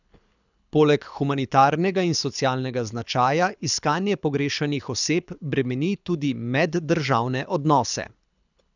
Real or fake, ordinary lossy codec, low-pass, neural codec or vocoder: fake; none; 7.2 kHz; codec, 24 kHz, 6 kbps, HILCodec